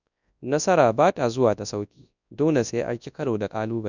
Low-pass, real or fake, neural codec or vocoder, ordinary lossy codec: 7.2 kHz; fake; codec, 24 kHz, 0.9 kbps, WavTokenizer, large speech release; none